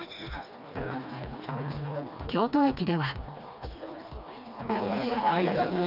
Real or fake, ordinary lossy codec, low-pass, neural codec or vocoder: fake; none; 5.4 kHz; codec, 16 kHz, 2 kbps, FreqCodec, smaller model